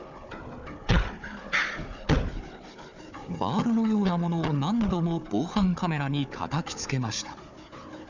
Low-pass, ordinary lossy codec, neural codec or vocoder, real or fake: 7.2 kHz; none; codec, 16 kHz, 4 kbps, FunCodec, trained on Chinese and English, 50 frames a second; fake